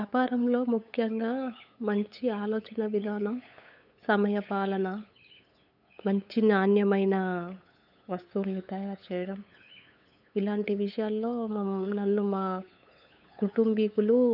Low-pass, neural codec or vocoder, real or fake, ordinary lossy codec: 5.4 kHz; codec, 16 kHz, 8 kbps, FunCodec, trained on LibriTTS, 25 frames a second; fake; none